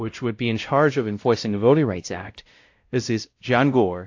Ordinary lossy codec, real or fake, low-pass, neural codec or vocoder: AAC, 48 kbps; fake; 7.2 kHz; codec, 16 kHz, 0.5 kbps, X-Codec, WavLM features, trained on Multilingual LibriSpeech